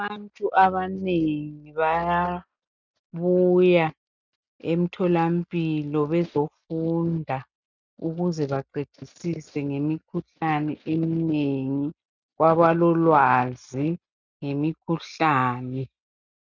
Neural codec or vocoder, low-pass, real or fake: none; 7.2 kHz; real